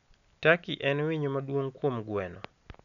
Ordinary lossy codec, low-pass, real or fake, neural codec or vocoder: none; 7.2 kHz; real; none